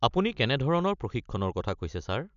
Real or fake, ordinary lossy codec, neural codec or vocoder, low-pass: real; none; none; 7.2 kHz